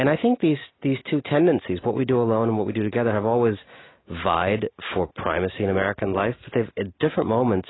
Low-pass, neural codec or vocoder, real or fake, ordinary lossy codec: 7.2 kHz; none; real; AAC, 16 kbps